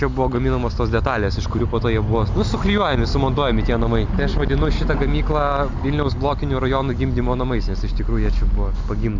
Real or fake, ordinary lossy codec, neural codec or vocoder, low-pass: real; MP3, 64 kbps; none; 7.2 kHz